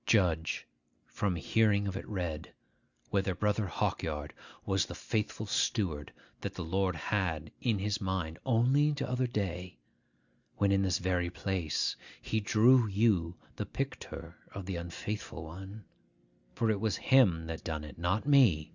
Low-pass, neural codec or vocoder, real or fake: 7.2 kHz; none; real